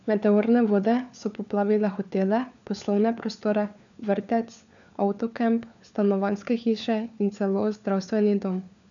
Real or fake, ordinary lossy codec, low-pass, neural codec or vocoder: fake; none; 7.2 kHz; codec, 16 kHz, 4 kbps, FunCodec, trained on LibriTTS, 50 frames a second